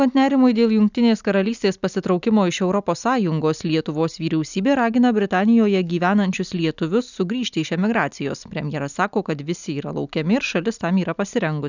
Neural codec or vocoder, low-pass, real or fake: none; 7.2 kHz; real